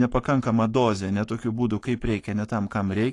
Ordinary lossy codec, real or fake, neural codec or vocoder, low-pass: AAC, 48 kbps; fake; codec, 44.1 kHz, 7.8 kbps, Pupu-Codec; 10.8 kHz